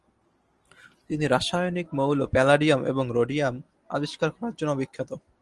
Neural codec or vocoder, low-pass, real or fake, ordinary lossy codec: none; 10.8 kHz; real; Opus, 24 kbps